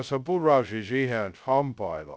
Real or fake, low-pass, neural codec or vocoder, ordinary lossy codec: fake; none; codec, 16 kHz, 0.2 kbps, FocalCodec; none